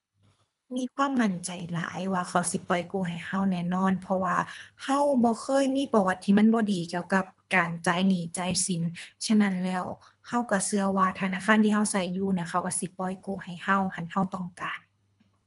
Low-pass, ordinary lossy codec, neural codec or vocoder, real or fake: 10.8 kHz; none; codec, 24 kHz, 3 kbps, HILCodec; fake